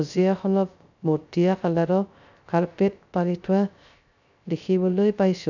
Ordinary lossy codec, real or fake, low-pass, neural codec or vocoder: none; fake; 7.2 kHz; codec, 16 kHz, 0.3 kbps, FocalCodec